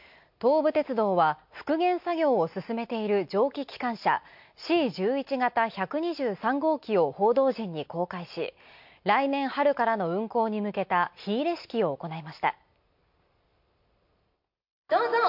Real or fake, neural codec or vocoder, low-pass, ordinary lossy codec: real; none; 5.4 kHz; MP3, 48 kbps